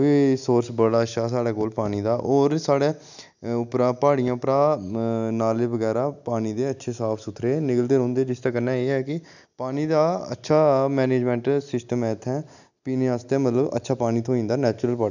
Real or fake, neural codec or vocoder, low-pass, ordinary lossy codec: real; none; 7.2 kHz; none